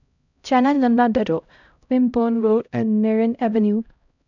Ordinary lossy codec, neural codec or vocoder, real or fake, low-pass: none; codec, 16 kHz, 0.5 kbps, X-Codec, HuBERT features, trained on LibriSpeech; fake; 7.2 kHz